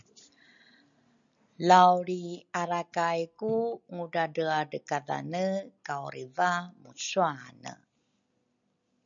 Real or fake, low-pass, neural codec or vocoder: real; 7.2 kHz; none